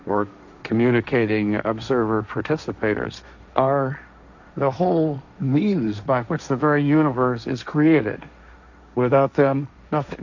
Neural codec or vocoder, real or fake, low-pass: codec, 16 kHz, 1.1 kbps, Voila-Tokenizer; fake; 7.2 kHz